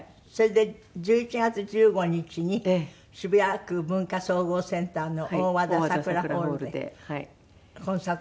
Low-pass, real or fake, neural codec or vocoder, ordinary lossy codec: none; real; none; none